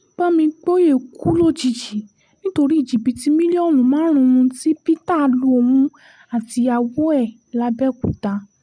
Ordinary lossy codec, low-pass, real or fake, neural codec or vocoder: none; 9.9 kHz; real; none